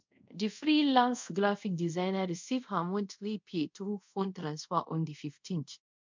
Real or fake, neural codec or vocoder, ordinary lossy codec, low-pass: fake; codec, 24 kHz, 0.5 kbps, DualCodec; MP3, 64 kbps; 7.2 kHz